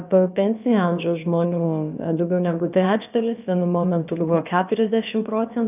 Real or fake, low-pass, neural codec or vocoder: fake; 3.6 kHz; codec, 16 kHz, about 1 kbps, DyCAST, with the encoder's durations